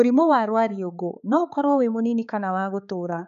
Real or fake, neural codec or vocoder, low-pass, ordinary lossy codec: fake; codec, 16 kHz, 4 kbps, X-Codec, HuBERT features, trained on balanced general audio; 7.2 kHz; none